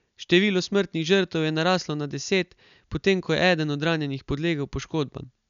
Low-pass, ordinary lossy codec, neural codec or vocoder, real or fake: 7.2 kHz; none; none; real